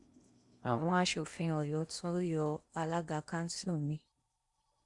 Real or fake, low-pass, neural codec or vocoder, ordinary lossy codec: fake; 10.8 kHz; codec, 16 kHz in and 24 kHz out, 0.8 kbps, FocalCodec, streaming, 65536 codes; Opus, 64 kbps